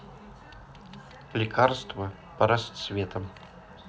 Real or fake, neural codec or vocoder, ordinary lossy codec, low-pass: real; none; none; none